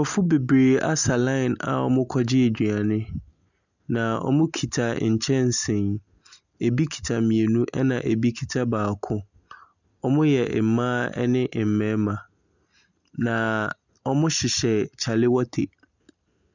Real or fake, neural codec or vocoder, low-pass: real; none; 7.2 kHz